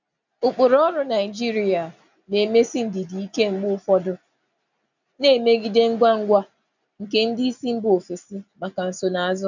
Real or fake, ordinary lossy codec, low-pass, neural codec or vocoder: real; none; 7.2 kHz; none